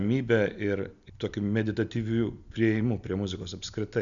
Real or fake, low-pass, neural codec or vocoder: real; 7.2 kHz; none